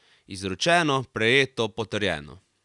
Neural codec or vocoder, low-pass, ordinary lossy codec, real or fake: none; 10.8 kHz; none; real